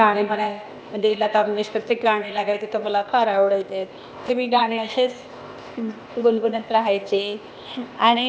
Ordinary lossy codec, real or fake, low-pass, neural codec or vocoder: none; fake; none; codec, 16 kHz, 0.8 kbps, ZipCodec